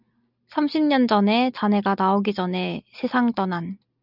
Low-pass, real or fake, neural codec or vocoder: 5.4 kHz; real; none